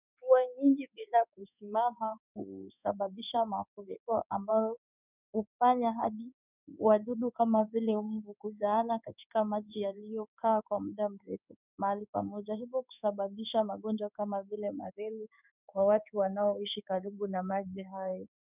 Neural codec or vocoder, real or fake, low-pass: codec, 16 kHz in and 24 kHz out, 1 kbps, XY-Tokenizer; fake; 3.6 kHz